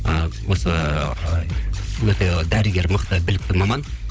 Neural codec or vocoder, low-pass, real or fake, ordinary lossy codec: codec, 16 kHz, 16 kbps, FunCodec, trained on LibriTTS, 50 frames a second; none; fake; none